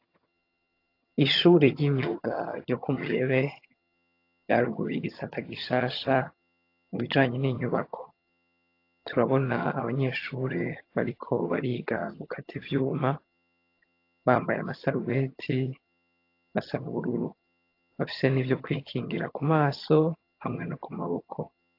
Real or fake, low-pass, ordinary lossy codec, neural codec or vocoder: fake; 5.4 kHz; AAC, 32 kbps; vocoder, 22.05 kHz, 80 mel bands, HiFi-GAN